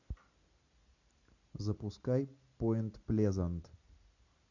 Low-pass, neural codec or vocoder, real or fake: 7.2 kHz; none; real